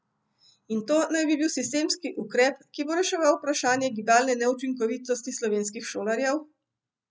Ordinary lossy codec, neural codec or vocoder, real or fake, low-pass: none; none; real; none